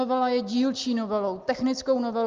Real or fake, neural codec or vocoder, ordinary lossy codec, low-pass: real; none; Opus, 24 kbps; 7.2 kHz